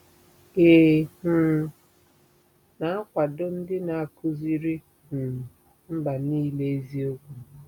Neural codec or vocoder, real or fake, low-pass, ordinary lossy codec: none; real; 19.8 kHz; none